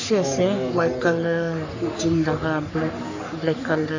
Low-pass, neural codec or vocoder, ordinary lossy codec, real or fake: 7.2 kHz; codec, 44.1 kHz, 3.4 kbps, Pupu-Codec; AAC, 48 kbps; fake